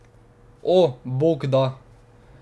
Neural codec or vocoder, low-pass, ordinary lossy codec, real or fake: none; none; none; real